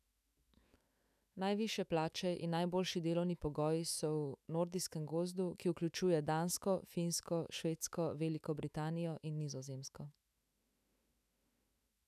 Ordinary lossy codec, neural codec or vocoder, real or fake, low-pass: none; autoencoder, 48 kHz, 128 numbers a frame, DAC-VAE, trained on Japanese speech; fake; 14.4 kHz